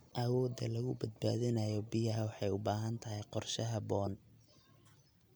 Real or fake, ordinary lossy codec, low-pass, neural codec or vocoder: real; none; none; none